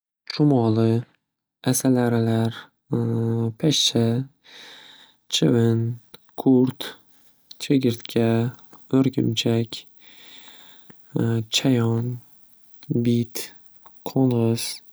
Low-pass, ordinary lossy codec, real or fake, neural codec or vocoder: none; none; real; none